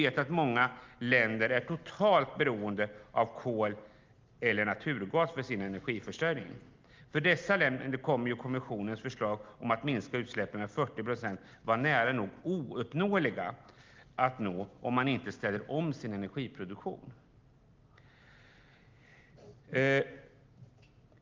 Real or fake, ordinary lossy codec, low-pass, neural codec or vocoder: real; Opus, 32 kbps; 7.2 kHz; none